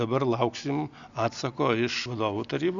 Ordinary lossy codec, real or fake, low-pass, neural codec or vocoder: Opus, 64 kbps; real; 7.2 kHz; none